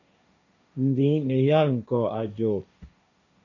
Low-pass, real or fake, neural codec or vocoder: 7.2 kHz; fake; codec, 16 kHz, 1.1 kbps, Voila-Tokenizer